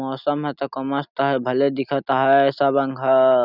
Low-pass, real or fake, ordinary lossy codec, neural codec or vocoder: 5.4 kHz; real; none; none